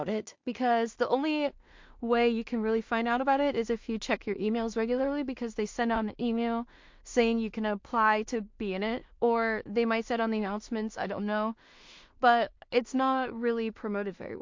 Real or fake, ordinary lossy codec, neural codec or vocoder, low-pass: fake; MP3, 48 kbps; codec, 16 kHz in and 24 kHz out, 0.4 kbps, LongCat-Audio-Codec, two codebook decoder; 7.2 kHz